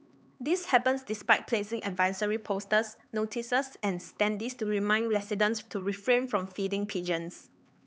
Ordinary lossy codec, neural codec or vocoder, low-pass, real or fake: none; codec, 16 kHz, 4 kbps, X-Codec, HuBERT features, trained on LibriSpeech; none; fake